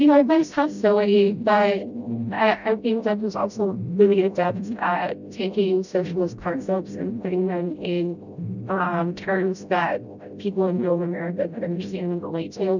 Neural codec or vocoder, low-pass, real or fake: codec, 16 kHz, 0.5 kbps, FreqCodec, smaller model; 7.2 kHz; fake